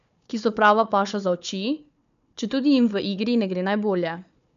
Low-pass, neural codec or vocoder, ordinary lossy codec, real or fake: 7.2 kHz; codec, 16 kHz, 4 kbps, FunCodec, trained on Chinese and English, 50 frames a second; none; fake